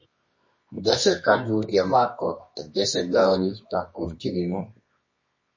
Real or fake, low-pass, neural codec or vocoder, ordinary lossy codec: fake; 7.2 kHz; codec, 24 kHz, 0.9 kbps, WavTokenizer, medium music audio release; MP3, 32 kbps